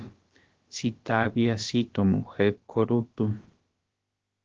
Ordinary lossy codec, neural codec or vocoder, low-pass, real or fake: Opus, 16 kbps; codec, 16 kHz, about 1 kbps, DyCAST, with the encoder's durations; 7.2 kHz; fake